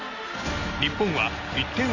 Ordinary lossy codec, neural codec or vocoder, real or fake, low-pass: none; none; real; 7.2 kHz